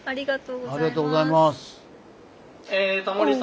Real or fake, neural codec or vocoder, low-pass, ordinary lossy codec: real; none; none; none